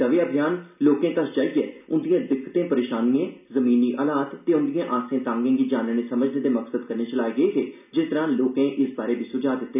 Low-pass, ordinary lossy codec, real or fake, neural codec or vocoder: 3.6 kHz; none; real; none